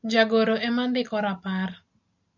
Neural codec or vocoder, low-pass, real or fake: none; 7.2 kHz; real